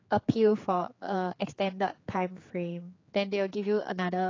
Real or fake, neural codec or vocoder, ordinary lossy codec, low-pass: fake; codec, 16 kHz, 4 kbps, X-Codec, HuBERT features, trained on general audio; AAC, 32 kbps; 7.2 kHz